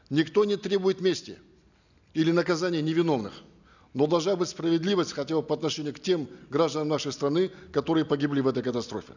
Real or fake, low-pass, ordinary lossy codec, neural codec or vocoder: real; 7.2 kHz; none; none